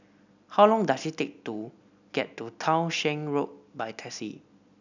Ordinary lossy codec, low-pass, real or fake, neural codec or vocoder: none; 7.2 kHz; real; none